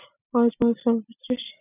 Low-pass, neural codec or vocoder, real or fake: 3.6 kHz; none; real